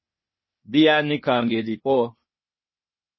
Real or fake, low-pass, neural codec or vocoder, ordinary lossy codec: fake; 7.2 kHz; codec, 16 kHz, 0.8 kbps, ZipCodec; MP3, 24 kbps